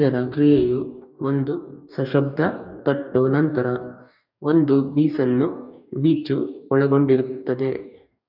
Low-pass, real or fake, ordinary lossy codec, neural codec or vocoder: 5.4 kHz; fake; none; codec, 44.1 kHz, 2.6 kbps, DAC